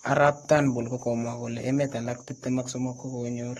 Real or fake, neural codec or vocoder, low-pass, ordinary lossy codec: fake; codec, 44.1 kHz, 7.8 kbps, Pupu-Codec; 19.8 kHz; AAC, 32 kbps